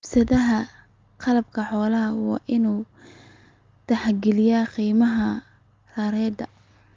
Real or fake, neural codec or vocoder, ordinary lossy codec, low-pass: real; none; Opus, 32 kbps; 7.2 kHz